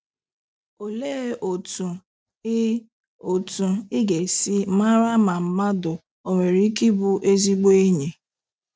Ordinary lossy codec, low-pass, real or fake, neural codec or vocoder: none; none; real; none